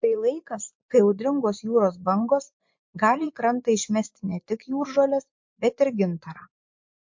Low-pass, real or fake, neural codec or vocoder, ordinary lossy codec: 7.2 kHz; fake; vocoder, 24 kHz, 100 mel bands, Vocos; MP3, 48 kbps